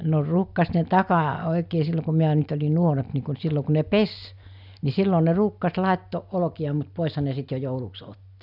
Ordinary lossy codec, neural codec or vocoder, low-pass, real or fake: none; none; 5.4 kHz; real